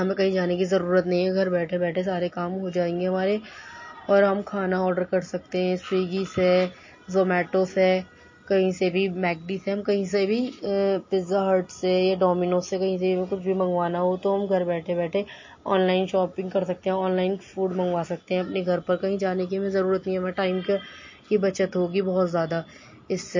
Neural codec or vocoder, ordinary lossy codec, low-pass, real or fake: none; MP3, 32 kbps; 7.2 kHz; real